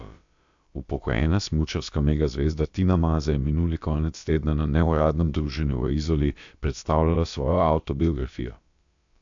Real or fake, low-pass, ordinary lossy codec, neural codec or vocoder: fake; 7.2 kHz; AAC, 64 kbps; codec, 16 kHz, about 1 kbps, DyCAST, with the encoder's durations